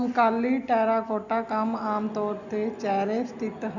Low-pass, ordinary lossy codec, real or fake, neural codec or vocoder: 7.2 kHz; none; real; none